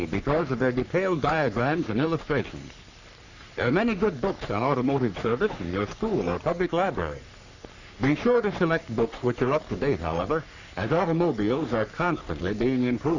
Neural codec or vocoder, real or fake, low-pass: codec, 44.1 kHz, 3.4 kbps, Pupu-Codec; fake; 7.2 kHz